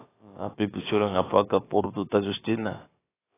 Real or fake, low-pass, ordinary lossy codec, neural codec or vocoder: fake; 3.6 kHz; AAC, 16 kbps; codec, 16 kHz, about 1 kbps, DyCAST, with the encoder's durations